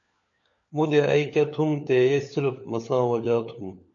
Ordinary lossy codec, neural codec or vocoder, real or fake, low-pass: AAC, 64 kbps; codec, 16 kHz, 4 kbps, FunCodec, trained on LibriTTS, 50 frames a second; fake; 7.2 kHz